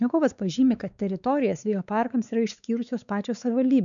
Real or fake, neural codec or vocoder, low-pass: fake; codec, 16 kHz, 4 kbps, X-Codec, WavLM features, trained on Multilingual LibriSpeech; 7.2 kHz